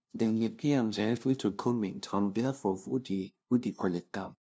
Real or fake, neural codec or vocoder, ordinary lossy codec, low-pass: fake; codec, 16 kHz, 0.5 kbps, FunCodec, trained on LibriTTS, 25 frames a second; none; none